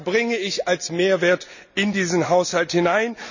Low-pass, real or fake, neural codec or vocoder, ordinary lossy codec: 7.2 kHz; real; none; none